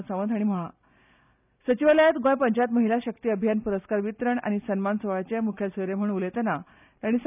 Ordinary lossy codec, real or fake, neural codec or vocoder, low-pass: none; real; none; 3.6 kHz